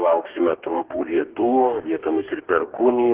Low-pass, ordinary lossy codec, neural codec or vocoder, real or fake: 3.6 kHz; Opus, 16 kbps; codec, 44.1 kHz, 2.6 kbps, DAC; fake